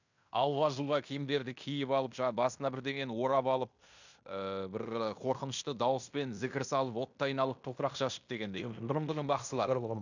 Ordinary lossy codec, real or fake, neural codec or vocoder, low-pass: none; fake; codec, 16 kHz in and 24 kHz out, 0.9 kbps, LongCat-Audio-Codec, fine tuned four codebook decoder; 7.2 kHz